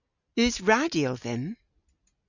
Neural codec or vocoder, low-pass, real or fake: none; 7.2 kHz; real